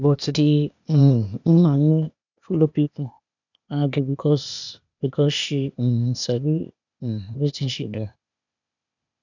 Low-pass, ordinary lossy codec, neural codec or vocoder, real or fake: 7.2 kHz; none; codec, 16 kHz, 0.8 kbps, ZipCodec; fake